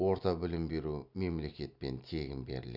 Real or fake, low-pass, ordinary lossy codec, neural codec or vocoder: real; 5.4 kHz; none; none